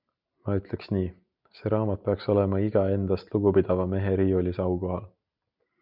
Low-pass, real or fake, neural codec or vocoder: 5.4 kHz; real; none